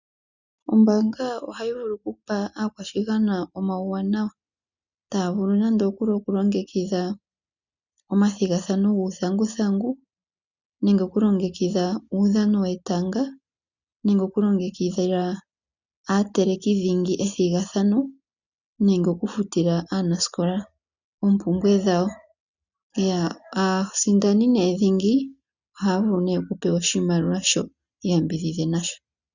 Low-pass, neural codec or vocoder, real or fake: 7.2 kHz; none; real